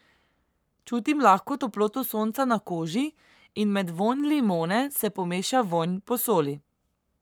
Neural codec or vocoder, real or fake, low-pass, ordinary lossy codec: codec, 44.1 kHz, 7.8 kbps, Pupu-Codec; fake; none; none